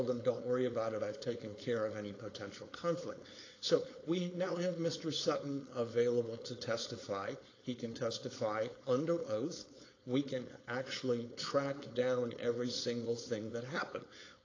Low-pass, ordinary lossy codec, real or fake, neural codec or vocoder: 7.2 kHz; AAC, 32 kbps; fake; codec, 16 kHz, 4.8 kbps, FACodec